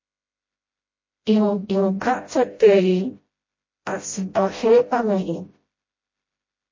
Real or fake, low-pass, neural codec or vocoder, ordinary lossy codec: fake; 7.2 kHz; codec, 16 kHz, 0.5 kbps, FreqCodec, smaller model; MP3, 32 kbps